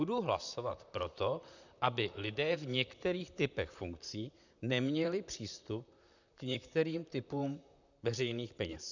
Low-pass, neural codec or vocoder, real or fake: 7.2 kHz; vocoder, 44.1 kHz, 128 mel bands, Pupu-Vocoder; fake